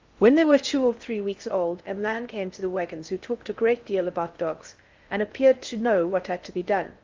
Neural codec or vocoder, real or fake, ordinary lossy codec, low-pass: codec, 16 kHz in and 24 kHz out, 0.6 kbps, FocalCodec, streaming, 4096 codes; fake; Opus, 32 kbps; 7.2 kHz